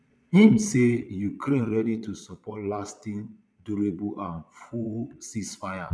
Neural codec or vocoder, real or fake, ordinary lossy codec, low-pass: vocoder, 22.05 kHz, 80 mel bands, Vocos; fake; none; none